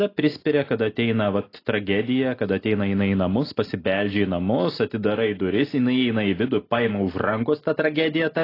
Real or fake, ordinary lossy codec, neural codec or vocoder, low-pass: real; AAC, 24 kbps; none; 5.4 kHz